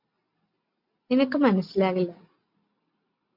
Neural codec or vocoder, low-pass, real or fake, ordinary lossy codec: none; 5.4 kHz; real; MP3, 48 kbps